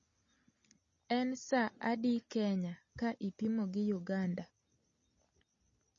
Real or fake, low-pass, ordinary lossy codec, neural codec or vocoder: real; 7.2 kHz; MP3, 32 kbps; none